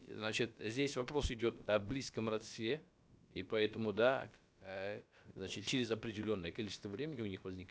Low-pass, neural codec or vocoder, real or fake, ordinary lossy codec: none; codec, 16 kHz, about 1 kbps, DyCAST, with the encoder's durations; fake; none